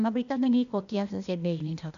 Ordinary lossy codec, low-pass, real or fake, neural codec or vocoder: none; 7.2 kHz; fake; codec, 16 kHz, 0.8 kbps, ZipCodec